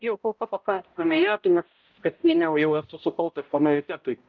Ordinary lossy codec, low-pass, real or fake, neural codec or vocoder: Opus, 24 kbps; 7.2 kHz; fake; codec, 16 kHz, 0.5 kbps, X-Codec, HuBERT features, trained on balanced general audio